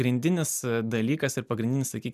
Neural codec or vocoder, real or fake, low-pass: none; real; 14.4 kHz